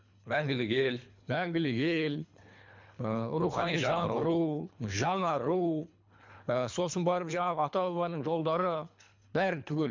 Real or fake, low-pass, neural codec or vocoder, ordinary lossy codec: fake; 7.2 kHz; codec, 24 kHz, 3 kbps, HILCodec; MP3, 64 kbps